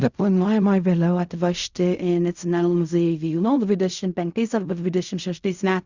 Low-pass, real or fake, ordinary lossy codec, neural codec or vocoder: 7.2 kHz; fake; Opus, 64 kbps; codec, 16 kHz in and 24 kHz out, 0.4 kbps, LongCat-Audio-Codec, fine tuned four codebook decoder